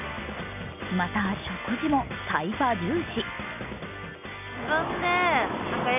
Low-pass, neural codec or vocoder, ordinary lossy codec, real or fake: 3.6 kHz; none; none; real